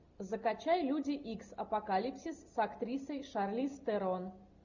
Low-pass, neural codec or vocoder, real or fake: 7.2 kHz; none; real